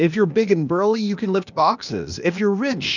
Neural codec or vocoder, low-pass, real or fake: codec, 16 kHz, 0.8 kbps, ZipCodec; 7.2 kHz; fake